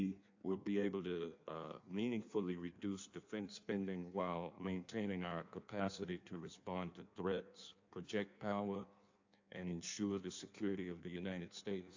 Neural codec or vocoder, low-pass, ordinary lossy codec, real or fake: codec, 16 kHz in and 24 kHz out, 1.1 kbps, FireRedTTS-2 codec; 7.2 kHz; AAC, 48 kbps; fake